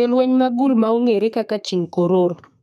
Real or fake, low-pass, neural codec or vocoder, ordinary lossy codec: fake; 14.4 kHz; codec, 32 kHz, 1.9 kbps, SNAC; none